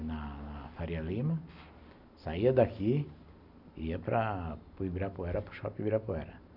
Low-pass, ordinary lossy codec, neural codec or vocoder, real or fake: 5.4 kHz; none; none; real